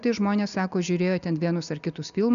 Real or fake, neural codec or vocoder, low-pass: real; none; 7.2 kHz